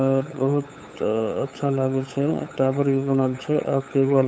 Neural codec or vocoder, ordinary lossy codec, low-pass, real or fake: codec, 16 kHz, 8 kbps, FunCodec, trained on LibriTTS, 25 frames a second; none; none; fake